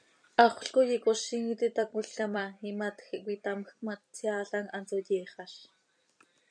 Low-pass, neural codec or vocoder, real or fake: 9.9 kHz; vocoder, 44.1 kHz, 128 mel bands every 256 samples, BigVGAN v2; fake